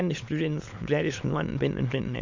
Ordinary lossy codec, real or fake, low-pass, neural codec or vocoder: MP3, 64 kbps; fake; 7.2 kHz; autoencoder, 22.05 kHz, a latent of 192 numbers a frame, VITS, trained on many speakers